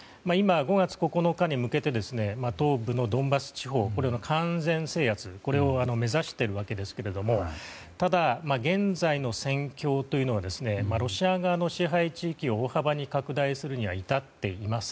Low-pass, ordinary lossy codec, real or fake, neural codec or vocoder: none; none; real; none